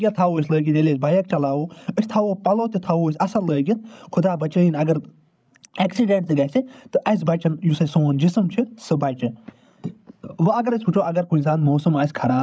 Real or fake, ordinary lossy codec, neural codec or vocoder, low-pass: fake; none; codec, 16 kHz, 16 kbps, FreqCodec, larger model; none